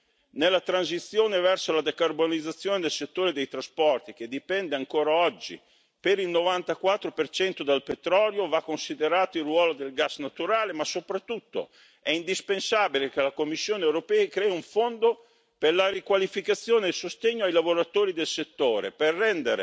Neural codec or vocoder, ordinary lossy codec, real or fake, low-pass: none; none; real; none